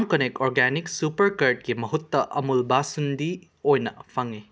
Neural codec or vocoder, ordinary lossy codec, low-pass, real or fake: none; none; none; real